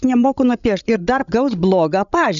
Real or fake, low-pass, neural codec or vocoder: fake; 7.2 kHz; codec, 16 kHz, 16 kbps, FreqCodec, larger model